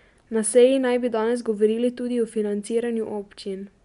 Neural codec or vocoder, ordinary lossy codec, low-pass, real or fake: none; none; 10.8 kHz; real